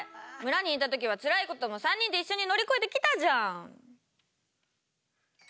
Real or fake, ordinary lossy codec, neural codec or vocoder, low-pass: real; none; none; none